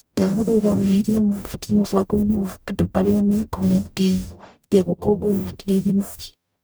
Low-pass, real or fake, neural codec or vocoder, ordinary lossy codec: none; fake; codec, 44.1 kHz, 0.9 kbps, DAC; none